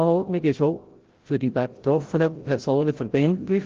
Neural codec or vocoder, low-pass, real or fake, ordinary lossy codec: codec, 16 kHz, 0.5 kbps, FreqCodec, larger model; 7.2 kHz; fake; Opus, 16 kbps